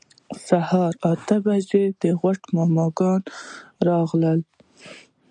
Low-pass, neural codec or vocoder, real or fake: 9.9 kHz; none; real